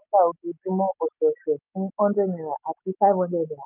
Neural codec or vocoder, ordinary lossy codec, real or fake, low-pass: none; none; real; 3.6 kHz